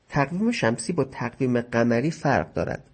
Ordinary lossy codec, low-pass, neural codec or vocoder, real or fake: MP3, 32 kbps; 10.8 kHz; none; real